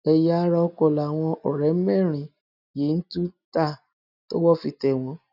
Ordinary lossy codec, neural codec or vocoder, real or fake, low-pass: none; none; real; 5.4 kHz